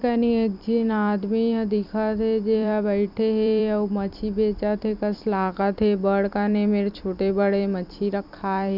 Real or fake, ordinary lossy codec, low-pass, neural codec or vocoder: fake; none; 5.4 kHz; vocoder, 44.1 kHz, 128 mel bands every 256 samples, BigVGAN v2